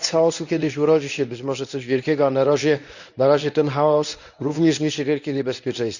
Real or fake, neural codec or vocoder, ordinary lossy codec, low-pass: fake; codec, 24 kHz, 0.9 kbps, WavTokenizer, medium speech release version 1; none; 7.2 kHz